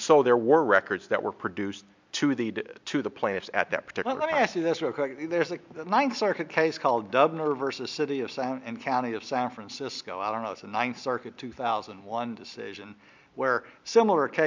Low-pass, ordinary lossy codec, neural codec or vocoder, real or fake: 7.2 kHz; MP3, 64 kbps; none; real